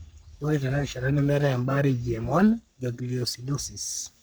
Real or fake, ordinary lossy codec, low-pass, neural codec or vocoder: fake; none; none; codec, 44.1 kHz, 3.4 kbps, Pupu-Codec